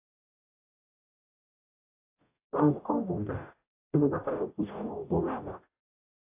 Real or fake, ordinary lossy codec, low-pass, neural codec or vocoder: fake; AAC, 24 kbps; 3.6 kHz; codec, 44.1 kHz, 0.9 kbps, DAC